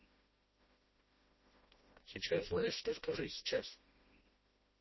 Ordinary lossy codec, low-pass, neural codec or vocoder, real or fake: MP3, 24 kbps; 7.2 kHz; codec, 16 kHz, 1 kbps, FreqCodec, smaller model; fake